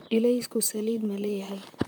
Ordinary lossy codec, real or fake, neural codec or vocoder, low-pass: none; fake; vocoder, 44.1 kHz, 128 mel bands, Pupu-Vocoder; none